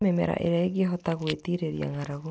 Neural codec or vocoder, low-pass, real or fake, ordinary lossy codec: none; none; real; none